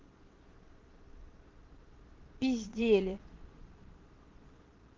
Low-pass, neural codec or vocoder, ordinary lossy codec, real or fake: 7.2 kHz; vocoder, 22.05 kHz, 80 mel bands, Vocos; Opus, 16 kbps; fake